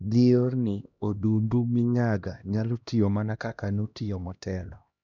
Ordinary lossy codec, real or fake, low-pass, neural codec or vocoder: none; fake; 7.2 kHz; codec, 16 kHz, 1 kbps, X-Codec, HuBERT features, trained on LibriSpeech